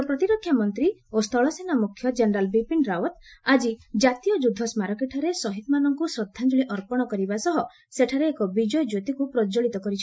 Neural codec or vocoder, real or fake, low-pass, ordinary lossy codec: none; real; none; none